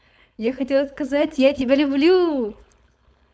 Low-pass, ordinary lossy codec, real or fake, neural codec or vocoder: none; none; fake; codec, 16 kHz, 4.8 kbps, FACodec